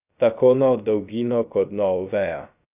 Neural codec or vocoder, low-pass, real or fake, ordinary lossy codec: codec, 16 kHz, 0.3 kbps, FocalCodec; 3.6 kHz; fake; none